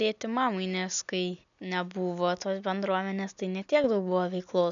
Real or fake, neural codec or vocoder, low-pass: real; none; 7.2 kHz